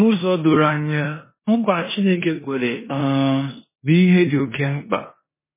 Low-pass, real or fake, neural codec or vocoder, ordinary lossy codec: 3.6 kHz; fake; codec, 16 kHz in and 24 kHz out, 0.9 kbps, LongCat-Audio-Codec, four codebook decoder; MP3, 16 kbps